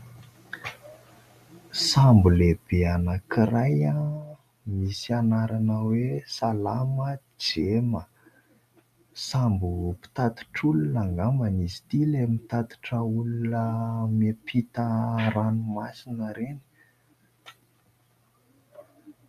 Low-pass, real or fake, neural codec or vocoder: 14.4 kHz; real; none